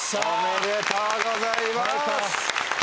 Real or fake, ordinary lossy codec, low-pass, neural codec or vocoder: real; none; none; none